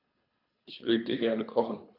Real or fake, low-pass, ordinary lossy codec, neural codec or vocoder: fake; 5.4 kHz; none; codec, 24 kHz, 3 kbps, HILCodec